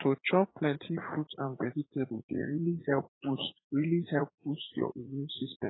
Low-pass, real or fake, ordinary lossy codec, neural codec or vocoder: 7.2 kHz; fake; AAC, 16 kbps; vocoder, 44.1 kHz, 80 mel bands, Vocos